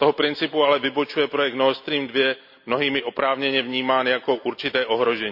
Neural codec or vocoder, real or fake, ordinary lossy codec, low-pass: none; real; none; 5.4 kHz